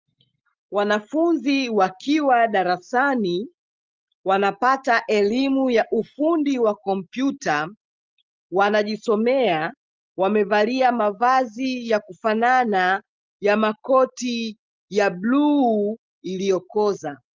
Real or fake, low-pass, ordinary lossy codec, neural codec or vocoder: real; 7.2 kHz; Opus, 24 kbps; none